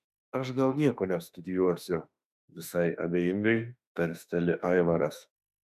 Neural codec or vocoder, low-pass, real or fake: autoencoder, 48 kHz, 32 numbers a frame, DAC-VAE, trained on Japanese speech; 14.4 kHz; fake